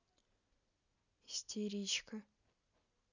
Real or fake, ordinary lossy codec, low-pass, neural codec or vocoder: real; none; 7.2 kHz; none